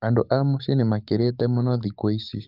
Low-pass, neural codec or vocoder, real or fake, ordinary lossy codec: 5.4 kHz; codec, 44.1 kHz, 7.8 kbps, DAC; fake; none